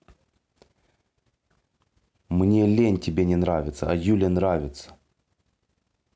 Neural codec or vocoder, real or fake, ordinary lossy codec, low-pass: none; real; none; none